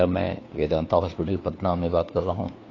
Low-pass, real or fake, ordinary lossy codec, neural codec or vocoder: 7.2 kHz; fake; AAC, 32 kbps; codec, 16 kHz, 2 kbps, FunCodec, trained on Chinese and English, 25 frames a second